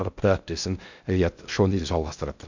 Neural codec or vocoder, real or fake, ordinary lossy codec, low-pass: codec, 16 kHz in and 24 kHz out, 0.6 kbps, FocalCodec, streaming, 2048 codes; fake; none; 7.2 kHz